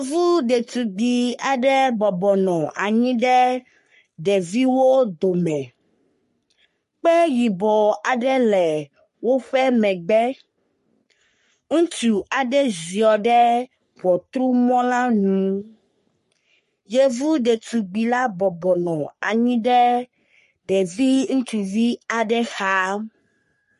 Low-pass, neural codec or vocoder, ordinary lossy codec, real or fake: 14.4 kHz; codec, 44.1 kHz, 3.4 kbps, Pupu-Codec; MP3, 48 kbps; fake